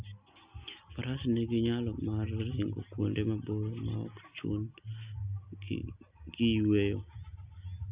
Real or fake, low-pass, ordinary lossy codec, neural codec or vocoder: real; 3.6 kHz; Opus, 64 kbps; none